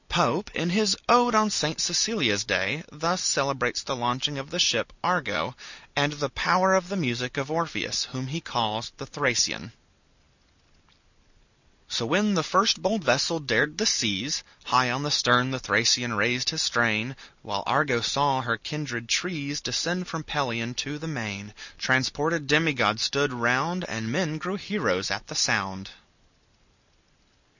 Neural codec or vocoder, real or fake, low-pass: none; real; 7.2 kHz